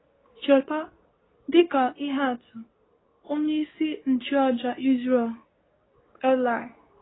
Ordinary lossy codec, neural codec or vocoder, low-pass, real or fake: AAC, 16 kbps; codec, 24 kHz, 0.9 kbps, WavTokenizer, medium speech release version 2; 7.2 kHz; fake